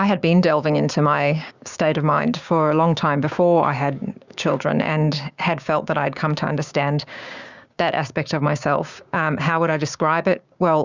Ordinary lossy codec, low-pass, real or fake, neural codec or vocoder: Opus, 64 kbps; 7.2 kHz; fake; codec, 16 kHz, 6 kbps, DAC